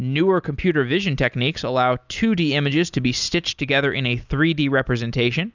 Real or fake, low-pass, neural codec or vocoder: real; 7.2 kHz; none